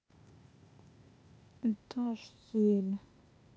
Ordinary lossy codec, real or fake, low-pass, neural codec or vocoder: none; fake; none; codec, 16 kHz, 0.8 kbps, ZipCodec